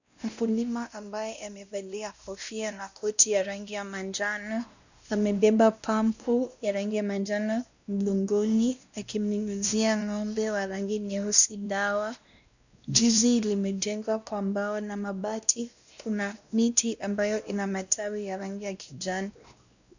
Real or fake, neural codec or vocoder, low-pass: fake; codec, 16 kHz, 1 kbps, X-Codec, WavLM features, trained on Multilingual LibriSpeech; 7.2 kHz